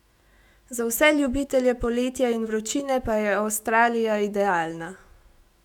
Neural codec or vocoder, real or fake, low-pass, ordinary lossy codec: autoencoder, 48 kHz, 128 numbers a frame, DAC-VAE, trained on Japanese speech; fake; 19.8 kHz; none